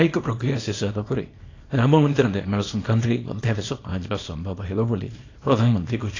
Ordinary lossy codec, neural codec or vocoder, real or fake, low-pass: AAC, 32 kbps; codec, 24 kHz, 0.9 kbps, WavTokenizer, small release; fake; 7.2 kHz